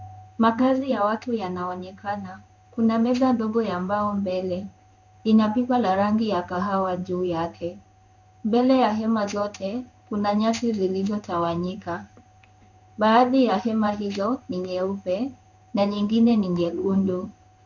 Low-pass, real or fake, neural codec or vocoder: 7.2 kHz; fake; codec, 16 kHz in and 24 kHz out, 1 kbps, XY-Tokenizer